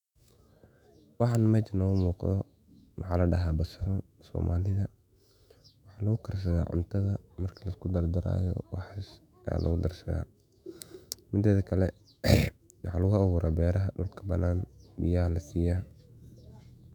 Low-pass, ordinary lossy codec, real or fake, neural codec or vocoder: 19.8 kHz; none; fake; autoencoder, 48 kHz, 128 numbers a frame, DAC-VAE, trained on Japanese speech